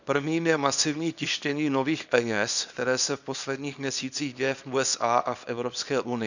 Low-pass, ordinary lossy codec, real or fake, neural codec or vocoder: 7.2 kHz; none; fake; codec, 24 kHz, 0.9 kbps, WavTokenizer, small release